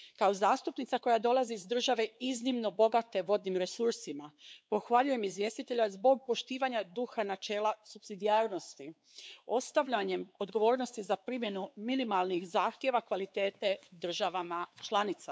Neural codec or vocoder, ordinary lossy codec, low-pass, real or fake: codec, 16 kHz, 4 kbps, X-Codec, WavLM features, trained on Multilingual LibriSpeech; none; none; fake